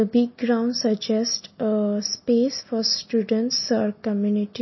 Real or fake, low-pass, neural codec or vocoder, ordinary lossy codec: real; 7.2 kHz; none; MP3, 24 kbps